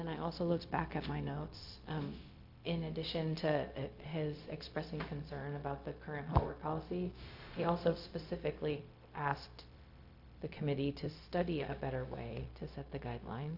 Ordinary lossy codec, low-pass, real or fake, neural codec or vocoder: AAC, 48 kbps; 5.4 kHz; fake; codec, 16 kHz, 0.4 kbps, LongCat-Audio-Codec